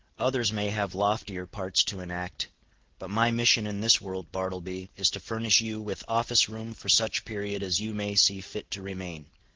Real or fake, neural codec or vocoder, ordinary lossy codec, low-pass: real; none; Opus, 16 kbps; 7.2 kHz